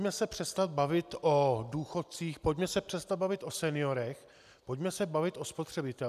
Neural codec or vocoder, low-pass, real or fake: none; 14.4 kHz; real